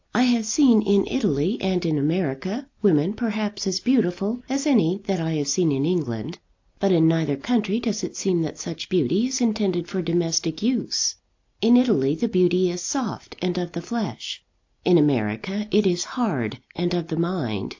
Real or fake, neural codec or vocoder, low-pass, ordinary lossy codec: real; none; 7.2 kHz; AAC, 48 kbps